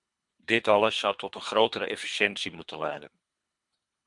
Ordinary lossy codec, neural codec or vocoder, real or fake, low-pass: MP3, 64 kbps; codec, 24 kHz, 3 kbps, HILCodec; fake; 10.8 kHz